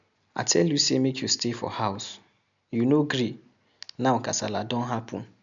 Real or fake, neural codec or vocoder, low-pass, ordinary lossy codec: real; none; 7.2 kHz; none